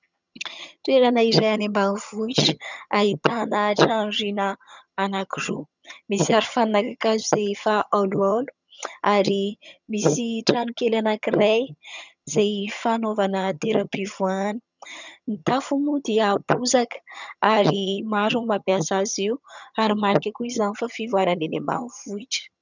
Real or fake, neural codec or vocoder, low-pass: fake; vocoder, 22.05 kHz, 80 mel bands, HiFi-GAN; 7.2 kHz